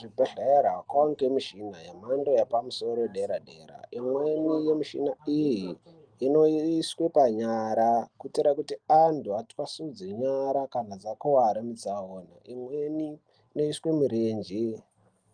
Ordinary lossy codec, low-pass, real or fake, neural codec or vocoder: Opus, 32 kbps; 9.9 kHz; real; none